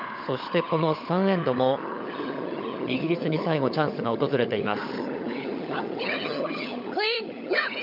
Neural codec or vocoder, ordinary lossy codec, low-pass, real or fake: codec, 16 kHz, 16 kbps, FunCodec, trained on LibriTTS, 50 frames a second; MP3, 48 kbps; 5.4 kHz; fake